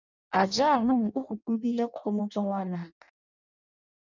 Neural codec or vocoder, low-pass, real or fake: codec, 16 kHz in and 24 kHz out, 0.6 kbps, FireRedTTS-2 codec; 7.2 kHz; fake